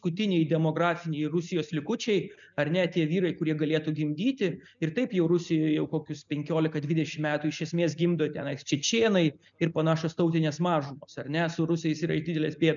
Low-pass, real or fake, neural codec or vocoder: 7.2 kHz; real; none